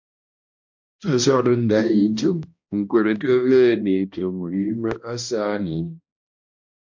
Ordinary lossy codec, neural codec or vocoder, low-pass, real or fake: MP3, 48 kbps; codec, 16 kHz, 1 kbps, X-Codec, HuBERT features, trained on balanced general audio; 7.2 kHz; fake